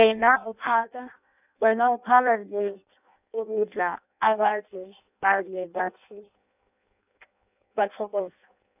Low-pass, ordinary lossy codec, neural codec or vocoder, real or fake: 3.6 kHz; none; codec, 16 kHz in and 24 kHz out, 0.6 kbps, FireRedTTS-2 codec; fake